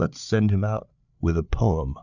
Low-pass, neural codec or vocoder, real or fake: 7.2 kHz; codec, 16 kHz, 8 kbps, FreqCodec, larger model; fake